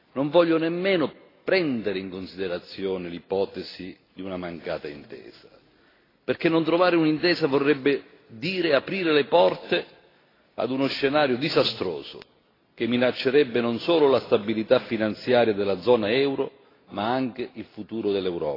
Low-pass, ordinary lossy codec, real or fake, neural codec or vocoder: 5.4 kHz; AAC, 24 kbps; real; none